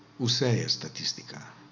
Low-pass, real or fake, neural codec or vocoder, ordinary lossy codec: 7.2 kHz; real; none; none